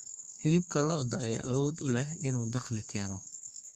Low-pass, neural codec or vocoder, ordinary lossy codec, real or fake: 14.4 kHz; codec, 32 kHz, 1.9 kbps, SNAC; Opus, 64 kbps; fake